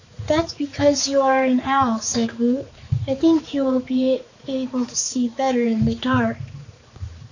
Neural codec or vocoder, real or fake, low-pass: codec, 16 kHz, 4 kbps, X-Codec, HuBERT features, trained on balanced general audio; fake; 7.2 kHz